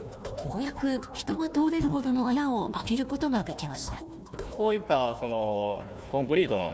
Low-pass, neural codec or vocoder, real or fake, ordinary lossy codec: none; codec, 16 kHz, 1 kbps, FunCodec, trained on Chinese and English, 50 frames a second; fake; none